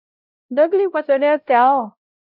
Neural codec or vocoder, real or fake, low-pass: codec, 16 kHz, 0.5 kbps, X-Codec, WavLM features, trained on Multilingual LibriSpeech; fake; 5.4 kHz